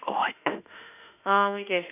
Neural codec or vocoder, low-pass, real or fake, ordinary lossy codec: autoencoder, 48 kHz, 32 numbers a frame, DAC-VAE, trained on Japanese speech; 3.6 kHz; fake; none